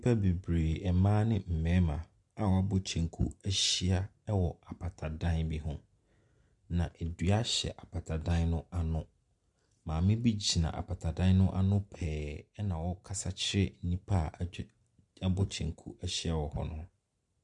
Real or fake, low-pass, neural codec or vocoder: real; 10.8 kHz; none